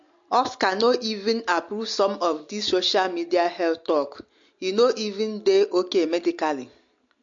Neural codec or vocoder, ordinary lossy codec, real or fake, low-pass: none; MP3, 48 kbps; real; 7.2 kHz